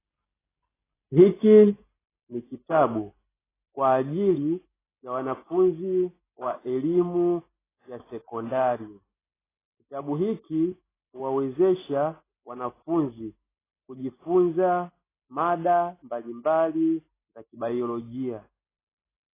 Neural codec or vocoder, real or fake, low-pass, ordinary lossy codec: none; real; 3.6 kHz; AAC, 16 kbps